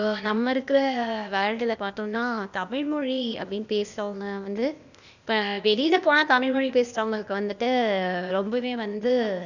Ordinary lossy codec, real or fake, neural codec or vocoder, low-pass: none; fake; codec, 16 kHz, 0.8 kbps, ZipCodec; 7.2 kHz